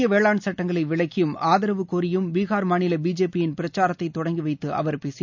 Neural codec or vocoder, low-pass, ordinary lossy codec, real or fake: none; 7.2 kHz; none; real